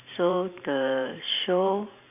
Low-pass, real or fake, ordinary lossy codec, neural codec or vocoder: 3.6 kHz; fake; none; vocoder, 44.1 kHz, 128 mel bands every 512 samples, BigVGAN v2